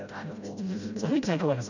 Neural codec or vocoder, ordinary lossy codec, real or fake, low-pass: codec, 16 kHz, 0.5 kbps, FreqCodec, smaller model; none; fake; 7.2 kHz